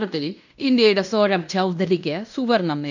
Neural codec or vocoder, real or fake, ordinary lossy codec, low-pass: codec, 16 kHz in and 24 kHz out, 0.9 kbps, LongCat-Audio-Codec, fine tuned four codebook decoder; fake; none; 7.2 kHz